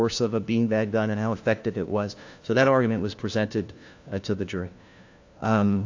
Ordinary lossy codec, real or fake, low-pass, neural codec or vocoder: AAC, 48 kbps; fake; 7.2 kHz; codec, 16 kHz, 1 kbps, FunCodec, trained on LibriTTS, 50 frames a second